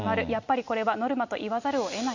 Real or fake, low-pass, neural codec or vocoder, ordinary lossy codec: real; 7.2 kHz; none; none